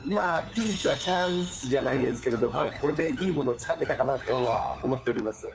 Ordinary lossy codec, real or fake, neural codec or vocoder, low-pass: none; fake; codec, 16 kHz, 4 kbps, FunCodec, trained on LibriTTS, 50 frames a second; none